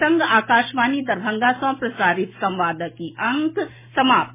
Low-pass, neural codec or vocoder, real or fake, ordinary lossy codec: 3.6 kHz; none; real; MP3, 16 kbps